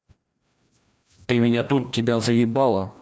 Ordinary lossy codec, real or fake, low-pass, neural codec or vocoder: none; fake; none; codec, 16 kHz, 1 kbps, FreqCodec, larger model